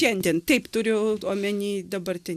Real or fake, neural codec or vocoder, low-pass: real; none; 14.4 kHz